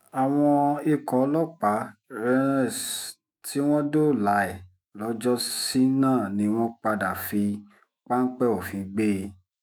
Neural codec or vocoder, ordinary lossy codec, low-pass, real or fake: autoencoder, 48 kHz, 128 numbers a frame, DAC-VAE, trained on Japanese speech; none; none; fake